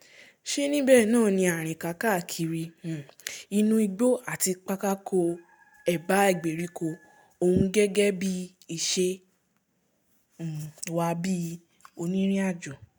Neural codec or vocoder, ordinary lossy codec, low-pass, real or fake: none; none; none; real